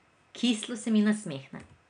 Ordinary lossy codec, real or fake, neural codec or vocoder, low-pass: none; real; none; 9.9 kHz